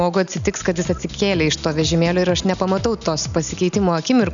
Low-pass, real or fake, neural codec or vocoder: 7.2 kHz; real; none